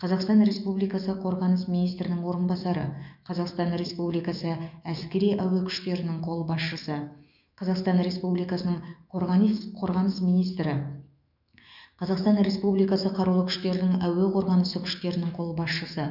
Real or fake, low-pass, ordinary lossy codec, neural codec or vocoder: fake; 5.4 kHz; none; autoencoder, 48 kHz, 128 numbers a frame, DAC-VAE, trained on Japanese speech